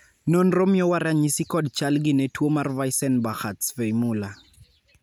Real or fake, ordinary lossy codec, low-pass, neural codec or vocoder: real; none; none; none